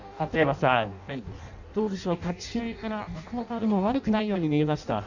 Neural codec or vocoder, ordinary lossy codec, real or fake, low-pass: codec, 16 kHz in and 24 kHz out, 0.6 kbps, FireRedTTS-2 codec; none; fake; 7.2 kHz